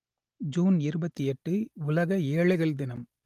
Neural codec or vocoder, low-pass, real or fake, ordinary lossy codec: none; 14.4 kHz; real; Opus, 24 kbps